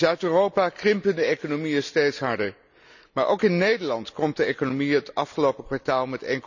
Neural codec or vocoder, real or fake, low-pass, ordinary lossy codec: none; real; 7.2 kHz; none